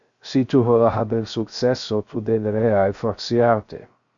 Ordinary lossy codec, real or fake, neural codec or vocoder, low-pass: Opus, 64 kbps; fake; codec, 16 kHz, 0.3 kbps, FocalCodec; 7.2 kHz